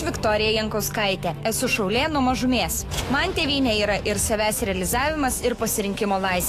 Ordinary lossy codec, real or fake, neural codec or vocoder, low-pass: AAC, 48 kbps; fake; autoencoder, 48 kHz, 128 numbers a frame, DAC-VAE, trained on Japanese speech; 14.4 kHz